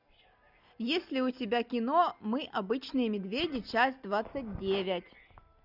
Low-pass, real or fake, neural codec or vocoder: 5.4 kHz; real; none